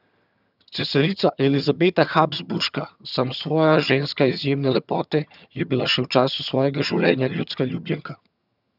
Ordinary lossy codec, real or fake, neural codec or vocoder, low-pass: none; fake; vocoder, 22.05 kHz, 80 mel bands, HiFi-GAN; 5.4 kHz